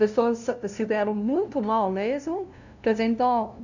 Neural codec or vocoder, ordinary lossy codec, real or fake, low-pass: codec, 16 kHz, 0.5 kbps, FunCodec, trained on LibriTTS, 25 frames a second; none; fake; 7.2 kHz